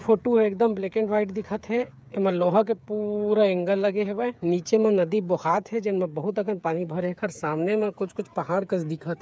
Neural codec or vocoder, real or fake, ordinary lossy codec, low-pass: codec, 16 kHz, 8 kbps, FreqCodec, smaller model; fake; none; none